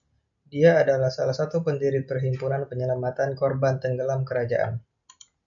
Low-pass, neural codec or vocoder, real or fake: 7.2 kHz; none; real